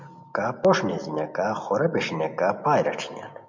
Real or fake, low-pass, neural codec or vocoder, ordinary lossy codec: real; 7.2 kHz; none; MP3, 64 kbps